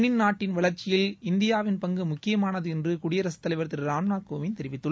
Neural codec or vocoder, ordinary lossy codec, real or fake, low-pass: none; none; real; none